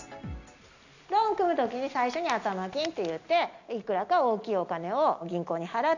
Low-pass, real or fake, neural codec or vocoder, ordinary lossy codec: 7.2 kHz; real; none; none